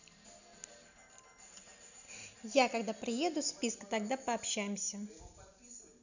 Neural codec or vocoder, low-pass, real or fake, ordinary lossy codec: none; 7.2 kHz; real; none